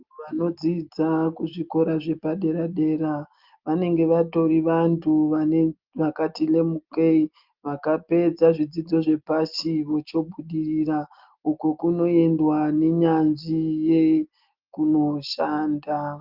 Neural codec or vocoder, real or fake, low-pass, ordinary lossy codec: none; real; 5.4 kHz; Opus, 32 kbps